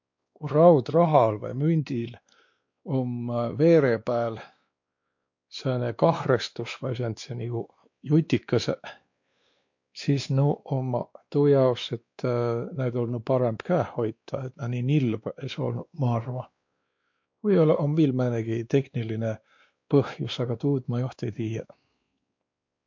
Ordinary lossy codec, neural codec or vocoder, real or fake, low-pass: MP3, 48 kbps; codec, 16 kHz, 2 kbps, X-Codec, WavLM features, trained on Multilingual LibriSpeech; fake; 7.2 kHz